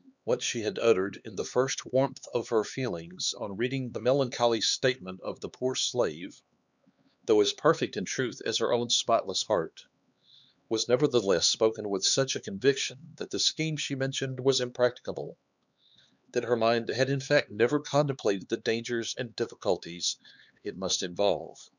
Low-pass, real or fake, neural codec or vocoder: 7.2 kHz; fake; codec, 16 kHz, 4 kbps, X-Codec, HuBERT features, trained on LibriSpeech